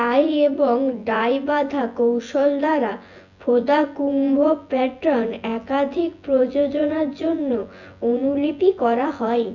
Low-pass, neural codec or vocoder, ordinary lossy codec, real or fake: 7.2 kHz; vocoder, 24 kHz, 100 mel bands, Vocos; none; fake